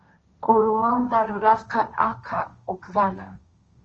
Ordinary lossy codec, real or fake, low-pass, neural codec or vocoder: Opus, 24 kbps; fake; 7.2 kHz; codec, 16 kHz, 1.1 kbps, Voila-Tokenizer